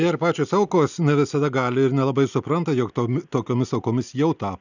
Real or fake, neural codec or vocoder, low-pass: real; none; 7.2 kHz